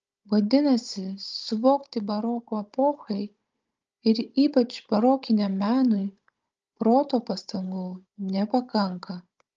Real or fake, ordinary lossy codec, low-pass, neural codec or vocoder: fake; Opus, 24 kbps; 7.2 kHz; codec, 16 kHz, 16 kbps, FunCodec, trained on Chinese and English, 50 frames a second